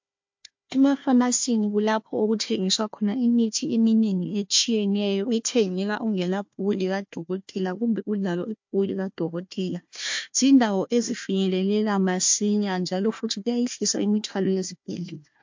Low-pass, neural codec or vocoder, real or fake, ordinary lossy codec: 7.2 kHz; codec, 16 kHz, 1 kbps, FunCodec, trained on Chinese and English, 50 frames a second; fake; MP3, 48 kbps